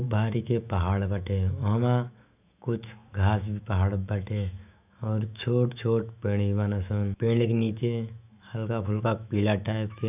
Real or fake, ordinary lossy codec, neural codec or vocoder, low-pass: fake; none; autoencoder, 48 kHz, 128 numbers a frame, DAC-VAE, trained on Japanese speech; 3.6 kHz